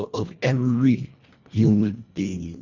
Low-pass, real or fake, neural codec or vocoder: 7.2 kHz; fake; codec, 24 kHz, 1.5 kbps, HILCodec